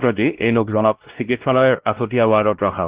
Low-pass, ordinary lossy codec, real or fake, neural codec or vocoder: 3.6 kHz; Opus, 16 kbps; fake; codec, 16 kHz, 1 kbps, X-Codec, WavLM features, trained on Multilingual LibriSpeech